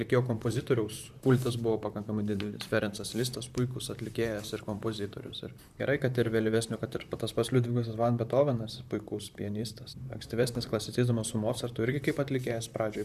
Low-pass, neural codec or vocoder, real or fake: 14.4 kHz; vocoder, 44.1 kHz, 128 mel bands, Pupu-Vocoder; fake